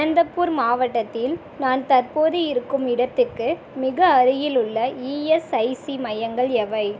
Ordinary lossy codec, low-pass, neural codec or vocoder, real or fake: none; none; none; real